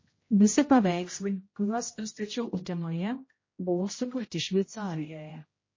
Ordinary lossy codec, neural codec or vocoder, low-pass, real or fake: MP3, 32 kbps; codec, 16 kHz, 0.5 kbps, X-Codec, HuBERT features, trained on general audio; 7.2 kHz; fake